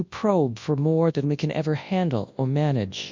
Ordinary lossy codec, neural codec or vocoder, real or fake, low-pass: MP3, 64 kbps; codec, 24 kHz, 0.9 kbps, WavTokenizer, large speech release; fake; 7.2 kHz